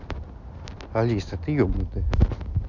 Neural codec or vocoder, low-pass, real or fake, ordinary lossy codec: none; 7.2 kHz; real; none